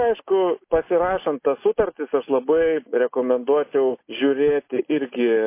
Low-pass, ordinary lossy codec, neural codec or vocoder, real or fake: 3.6 kHz; MP3, 24 kbps; none; real